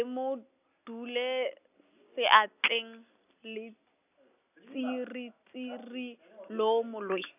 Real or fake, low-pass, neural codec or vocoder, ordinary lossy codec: real; 3.6 kHz; none; none